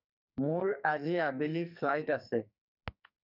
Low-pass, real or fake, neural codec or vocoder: 5.4 kHz; fake; codec, 44.1 kHz, 2.6 kbps, SNAC